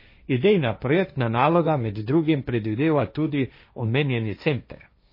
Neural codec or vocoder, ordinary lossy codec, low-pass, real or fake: codec, 16 kHz, 1.1 kbps, Voila-Tokenizer; MP3, 24 kbps; 5.4 kHz; fake